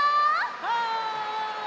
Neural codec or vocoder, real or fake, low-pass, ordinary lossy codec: none; real; none; none